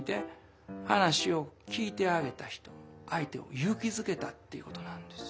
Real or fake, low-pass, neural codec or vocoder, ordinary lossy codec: real; none; none; none